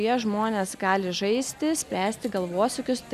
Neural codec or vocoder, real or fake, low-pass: none; real; 14.4 kHz